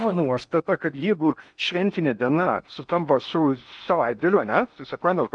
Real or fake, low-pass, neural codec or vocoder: fake; 9.9 kHz; codec, 16 kHz in and 24 kHz out, 0.8 kbps, FocalCodec, streaming, 65536 codes